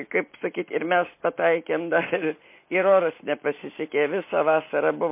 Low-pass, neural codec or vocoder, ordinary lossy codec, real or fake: 3.6 kHz; none; MP3, 24 kbps; real